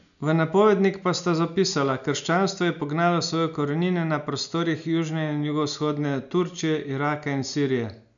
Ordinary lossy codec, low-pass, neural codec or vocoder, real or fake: none; 7.2 kHz; none; real